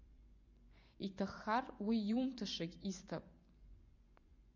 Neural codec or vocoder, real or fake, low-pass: vocoder, 24 kHz, 100 mel bands, Vocos; fake; 7.2 kHz